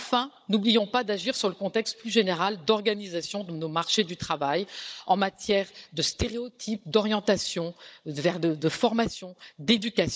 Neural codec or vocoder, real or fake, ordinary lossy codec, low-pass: codec, 16 kHz, 16 kbps, FunCodec, trained on Chinese and English, 50 frames a second; fake; none; none